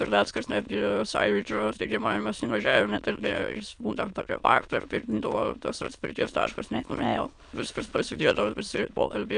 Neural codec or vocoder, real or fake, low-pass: autoencoder, 22.05 kHz, a latent of 192 numbers a frame, VITS, trained on many speakers; fake; 9.9 kHz